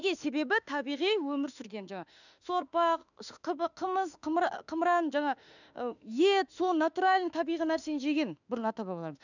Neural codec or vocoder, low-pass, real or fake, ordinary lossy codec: autoencoder, 48 kHz, 32 numbers a frame, DAC-VAE, trained on Japanese speech; 7.2 kHz; fake; none